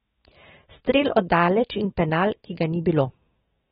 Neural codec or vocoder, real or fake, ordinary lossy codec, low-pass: autoencoder, 48 kHz, 128 numbers a frame, DAC-VAE, trained on Japanese speech; fake; AAC, 16 kbps; 19.8 kHz